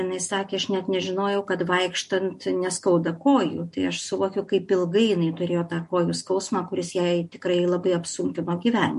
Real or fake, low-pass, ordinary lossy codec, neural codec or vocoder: real; 14.4 kHz; MP3, 48 kbps; none